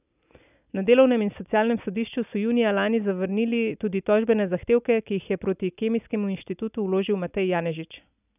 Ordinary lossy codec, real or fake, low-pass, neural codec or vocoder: none; real; 3.6 kHz; none